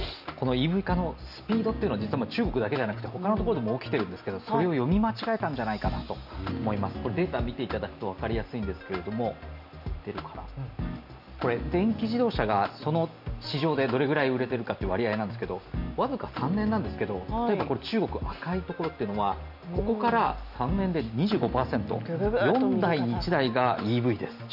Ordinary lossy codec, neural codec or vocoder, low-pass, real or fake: none; none; 5.4 kHz; real